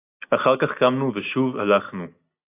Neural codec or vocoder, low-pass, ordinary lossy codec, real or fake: none; 3.6 kHz; AAC, 24 kbps; real